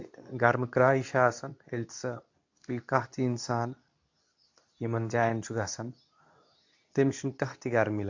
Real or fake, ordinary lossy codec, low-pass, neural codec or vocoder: fake; AAC, 48 kbps; 7.2 kHz; codec, 24 kHz, 0.9 kbps, WavTokenizer, medium speech release version 2